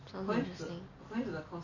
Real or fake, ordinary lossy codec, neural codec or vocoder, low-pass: real; none; none; 7.2 kHz